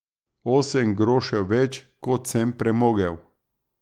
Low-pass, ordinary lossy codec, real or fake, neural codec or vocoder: 19.8 kHz; Opus, 24 kbps; fake; autoencoder, 48 kHz, 128 numbers a frame, DAC-VAE, trained on Japanese speech